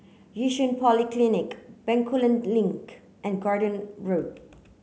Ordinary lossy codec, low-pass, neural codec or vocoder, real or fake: none; none; none; real